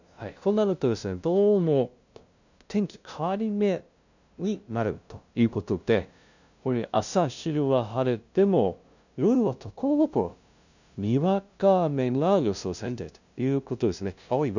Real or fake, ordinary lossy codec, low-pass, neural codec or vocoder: fake; none; 7.2 kHz; codec, 16 kHz, 0.5 kbps, FunCodec, trained on LibriTTS, 25 frames a second